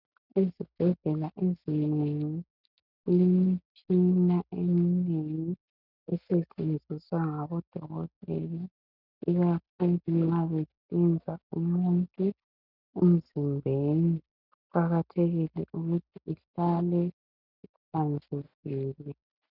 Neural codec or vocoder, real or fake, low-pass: none; real; 5.4 kHz